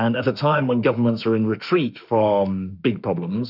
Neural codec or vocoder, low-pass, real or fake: autoencoder, 48 kHz, 32 numbers a frame, DAC-VAE, trained on Japanese speech; 5.4 kHz; fake